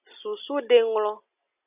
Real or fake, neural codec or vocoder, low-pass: real; none; 3.6 kHz